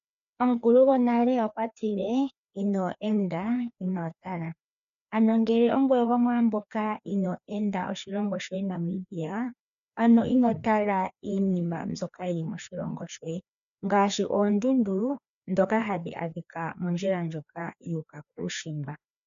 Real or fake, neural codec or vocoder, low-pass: fake; codec, 16 kHz, 2 kbps, FreqCodec, larger model; 7.2 kHz